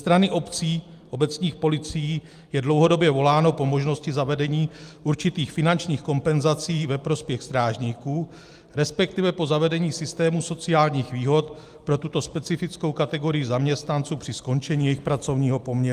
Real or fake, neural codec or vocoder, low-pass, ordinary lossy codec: real; none; 14.4 kHz; Opus, 32 kbps